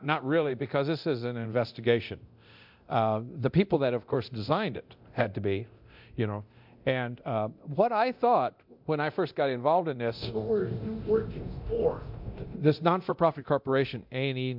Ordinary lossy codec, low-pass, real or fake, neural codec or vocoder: MP3, 48 kbps; 5.4 kHz; fake; codec, 24 kHz, 0.9 kbps, DualCodec